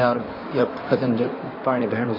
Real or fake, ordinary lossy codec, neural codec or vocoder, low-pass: fake; MP3, 24 kbps; codec, 16 kHz in and 24 kHz out, 2.2 kbps, FireRedTTS-2 codec; 5.4 kHz